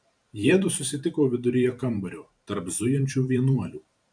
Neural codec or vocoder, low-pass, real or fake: vocoder, 44.1 kHz, 128 mel bands every 512 samples, BigVGAN v2; 9.9 kHz; fake